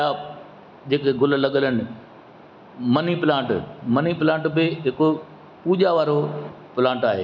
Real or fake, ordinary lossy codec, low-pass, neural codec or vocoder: real; none; 7.2 kHz; none